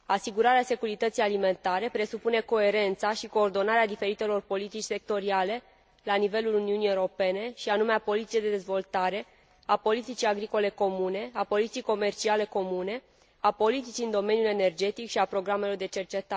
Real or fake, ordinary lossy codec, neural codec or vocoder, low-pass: real; none; none; none